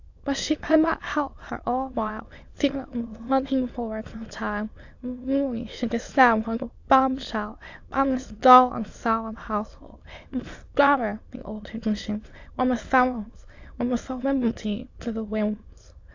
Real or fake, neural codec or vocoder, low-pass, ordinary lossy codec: fake; autoencoder, 22.05 kHz, a latent of 192 numbers a frame, VITS, trained on many speakers; 7.2 kHz; AAC, 48 kbps